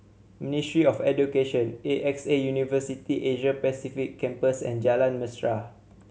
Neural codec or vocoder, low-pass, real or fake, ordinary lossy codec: none; none; real; none